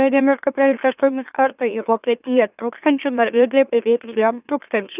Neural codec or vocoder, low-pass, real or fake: autoencoder, 44.1 kHz, a latent of 192 numbers a frame, MeloTTS; 3.6 kHz; fake